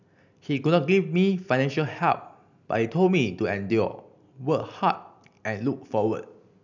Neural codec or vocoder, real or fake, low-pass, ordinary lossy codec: none; real; 7.2 kHz; none